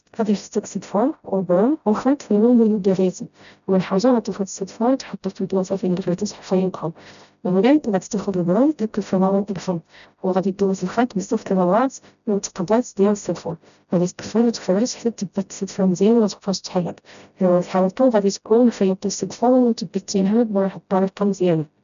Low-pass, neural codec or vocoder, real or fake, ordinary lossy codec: 7.2 kHz; codec, 16 kHz, 0.5 kbps, FreqCodec, smaller model; fake; none